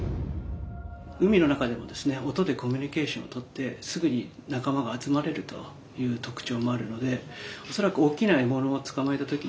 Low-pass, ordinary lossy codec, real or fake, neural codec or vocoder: none; none; real; none